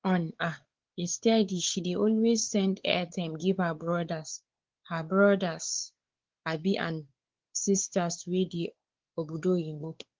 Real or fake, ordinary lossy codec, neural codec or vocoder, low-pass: fake; Opus, 16 kbps; codec, 16 kHz, 4 kbps, X-Codec, WavLM features, trained on Multilingual LibriSpeech; 7.2 kHz